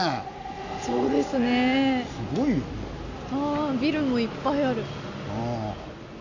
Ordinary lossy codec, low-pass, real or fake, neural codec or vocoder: AAC, 48 kbps; 7.2 kHz; real; none